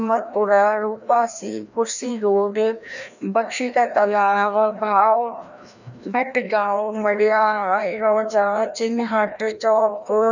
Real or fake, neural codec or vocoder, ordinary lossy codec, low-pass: fake; codec, 16 kHz, 1 kbps, FreqCodec, larger model; none; 7.2 kHz